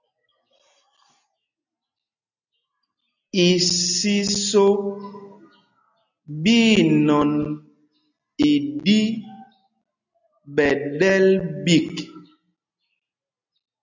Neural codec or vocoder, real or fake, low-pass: none; real; 7.2 kHz